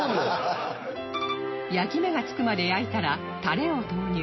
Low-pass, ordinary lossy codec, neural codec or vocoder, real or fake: 7.2 kHz; MP3, 24 kbps; none; real